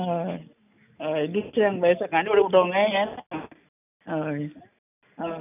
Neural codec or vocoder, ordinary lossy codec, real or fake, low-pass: none; none; real; 3.6 kHz